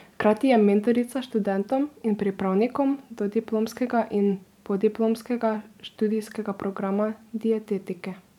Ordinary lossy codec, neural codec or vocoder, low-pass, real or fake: none; vocoder, 44.1 kHz, 128 mel bands every 512 samples, BigVGAN v2; 19.8 kHz; fake